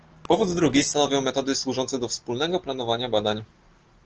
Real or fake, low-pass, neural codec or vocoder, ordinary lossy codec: real; 7.2 kHz; none; Opus, 16 kbps